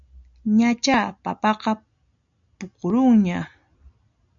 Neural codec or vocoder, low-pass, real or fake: none; 7.2 kHz; real